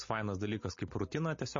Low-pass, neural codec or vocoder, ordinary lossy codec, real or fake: 7.2 kHz; none; MP3, 32 kbps; real